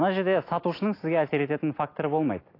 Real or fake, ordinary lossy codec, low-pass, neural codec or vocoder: real; AAC, 32 kbps; 5.4 kHz; none